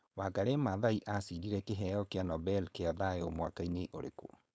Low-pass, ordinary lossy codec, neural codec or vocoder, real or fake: none; none; codec, 16 kHz, 4.8 kbps, FACodec; fake